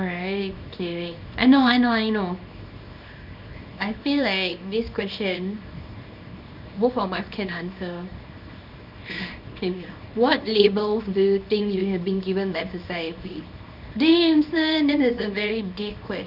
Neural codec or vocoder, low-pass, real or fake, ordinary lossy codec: codec, 24 kHz, 0.9 kbps, WavTokenizer, small release; 5.4 kHz; fake; none